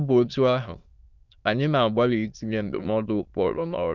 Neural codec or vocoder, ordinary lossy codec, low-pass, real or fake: autoencoder, 22.05 kHz, a latent of 192 numbers a frame, VITS, trained on many speakers; none; 7.2 kHz; fake